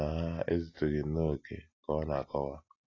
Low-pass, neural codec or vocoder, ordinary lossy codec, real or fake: 7.2 kHz; none; AAC, 32 kbps; real